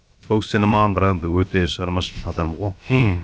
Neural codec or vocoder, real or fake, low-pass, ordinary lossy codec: codec, 16 kHz, about 1 kbps, DyCAST, with the encoder's durations; fake; none; none